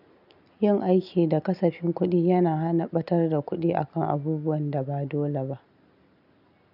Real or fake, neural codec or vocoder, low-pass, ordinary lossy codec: real; none; 5.4 kHz; none